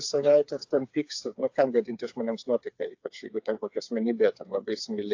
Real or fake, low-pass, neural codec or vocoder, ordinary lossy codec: fake; 7.2 kHz; codec, 16 kHz, 4 kbps, FreqCodec, smaller model; AAC, 48 kbps